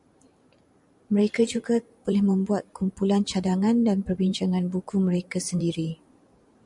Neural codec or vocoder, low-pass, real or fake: vocoder, 44.1 kHz, 128 mel bands every 512 samples, BigVGAN v2; 10.8 kHz; fake